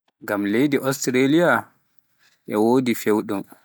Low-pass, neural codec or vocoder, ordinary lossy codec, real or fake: none; none; none; real